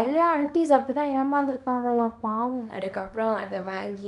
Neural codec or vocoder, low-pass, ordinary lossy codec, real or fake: codec, 24 kHz, 0.9 kbps, WavTokenizer, small release; 10.8 kHz; none; fake